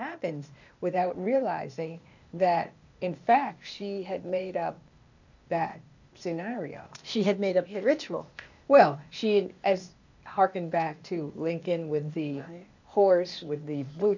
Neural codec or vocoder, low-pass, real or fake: codec, 16 kHz, 0.8 kbps, ZipCodec; 7.2 kHz; fake